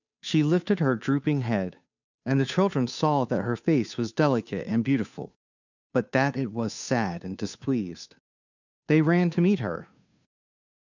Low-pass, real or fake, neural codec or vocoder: 7.2 kHz; fake; codec, 16 kHz, 2 kbps, FunCodec, trained on Chinese and English, 25 frames a second